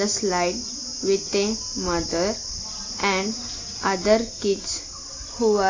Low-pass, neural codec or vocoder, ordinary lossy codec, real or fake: 7.2 kHz; none; AAC, 32 kbps; real